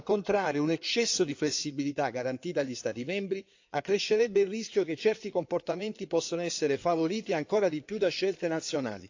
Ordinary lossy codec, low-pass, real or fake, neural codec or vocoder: AAC, 48 kbps; 7.2 kHz; fake; codec, 16 kHz in and 24 kHz out, 2.2 kbps, FireRedTTS-2 codec